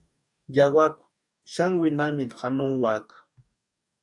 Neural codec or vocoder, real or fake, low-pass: codec, 44.1 kHz, 2.6 kbps, DAC; fake; 10.8 kHz